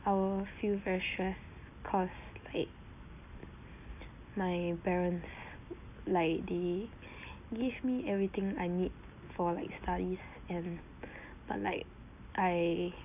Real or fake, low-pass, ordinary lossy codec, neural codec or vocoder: real; 3.6 kHz; none; none